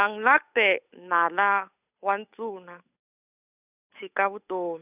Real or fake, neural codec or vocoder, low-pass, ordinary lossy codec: fake; codec, 16 kHz, 8 kbps, FunCodec, trained on Chinese and English, 25 frames a second; 3.6 kHz; none